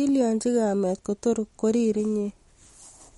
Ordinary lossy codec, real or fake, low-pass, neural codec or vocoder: MP3, 48 kbps; real; 19.8 kHz; none